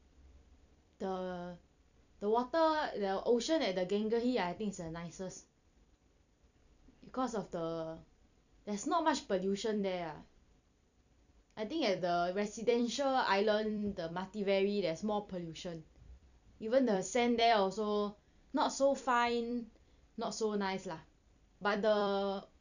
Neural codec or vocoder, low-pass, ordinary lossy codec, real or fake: vocoder, 44.1 kHz, 128 mel bands every 256 samples, BigVGAN v2; 7.2 kHz; none; fake